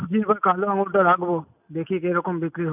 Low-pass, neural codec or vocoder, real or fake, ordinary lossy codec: 3.6 kHz; none; real; none